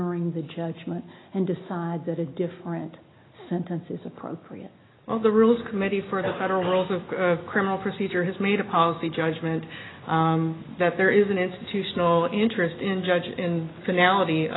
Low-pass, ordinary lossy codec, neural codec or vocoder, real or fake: 7.2 kHz; AAC, 16 kbps; none; real